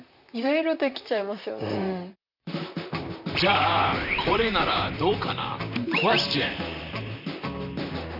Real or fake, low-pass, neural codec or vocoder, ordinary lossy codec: fake; 5.4 kHz; vocoder, 44.1 kHz, 128 mel bands, Pupu-Vocoder; none